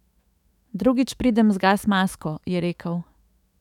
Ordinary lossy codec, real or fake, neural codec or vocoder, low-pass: none; fake; autoencoder, 48 kHz, 128 numbers a frame, DAC-VAE, trained on Japanese speech; 19.8 kHz